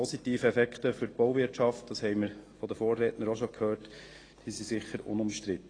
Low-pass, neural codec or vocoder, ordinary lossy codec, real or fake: 9.9 kHz; none; AAC, 32 kbps; real